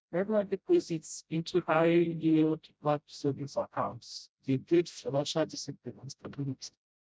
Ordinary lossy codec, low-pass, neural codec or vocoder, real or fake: none; none; codec, 16 kHz, 0.5 kbps, FreqCodec, smaller model; fake